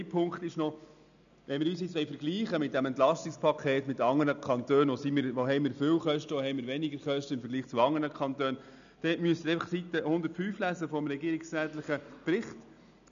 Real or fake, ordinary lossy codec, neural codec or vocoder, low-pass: real; none; none; 7.2 kHz